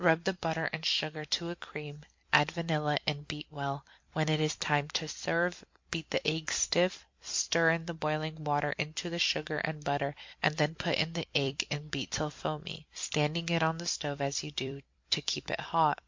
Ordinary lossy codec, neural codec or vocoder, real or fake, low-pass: MP3, 48 kbps; vocoder, 44.1 kHz, 128 mel bands every 256 samples, BigVGAN v2; fake; 7.2 kHz